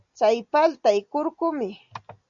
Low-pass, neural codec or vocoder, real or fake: 7.2 kHz; none; real